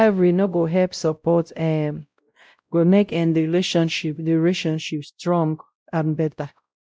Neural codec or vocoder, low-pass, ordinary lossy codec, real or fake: codec, 16 kHz, 0.5 kbps, X-Codec, WavLM features, trained on Multilingual LibriSpeech; none; none; fake